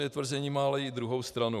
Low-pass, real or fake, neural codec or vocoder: 14.4 kHz; fake; vocoder, 44.1 kHz, 128 mel bands every 512 samples, BigVGAN v2